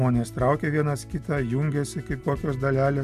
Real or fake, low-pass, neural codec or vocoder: real; 14.4 kHz; none